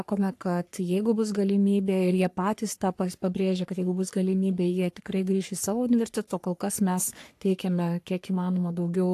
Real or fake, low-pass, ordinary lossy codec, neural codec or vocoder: fake; 14.4 kHz; AAC, 64 kbps; codec, 44.1 kHz, 3.4 kbps, Pupu-Codec